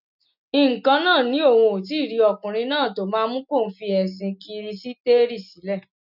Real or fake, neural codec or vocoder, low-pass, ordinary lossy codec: real; none; 5.4 kHz; none